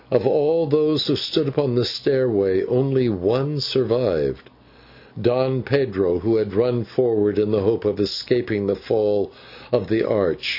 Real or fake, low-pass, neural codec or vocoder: real; 5.4 kHz; none